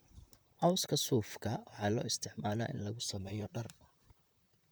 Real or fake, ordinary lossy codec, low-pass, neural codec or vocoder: fake; none; none; vocoder, 44.1 kHz, 128 mel bands, Pupu-Vocoder